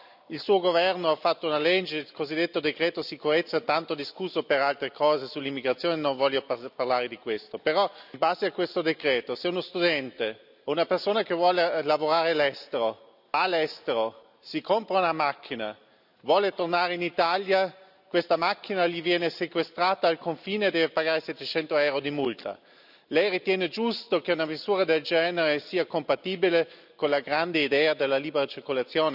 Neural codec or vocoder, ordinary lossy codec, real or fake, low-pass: none; none; real; 5.4 kHz